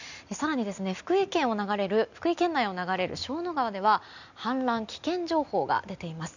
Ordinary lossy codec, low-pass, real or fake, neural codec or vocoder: none; 7.2 kHz; real; none